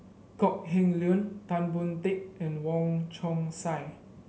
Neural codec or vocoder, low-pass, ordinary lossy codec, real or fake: none; none; none; real